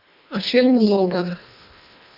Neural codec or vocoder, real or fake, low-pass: codec, 24 kHz, 1.5 kbps, HILCodec; fake; 5.4 kHz